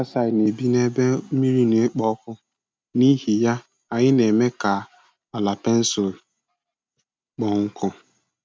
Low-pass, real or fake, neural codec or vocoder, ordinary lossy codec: none; real; none; none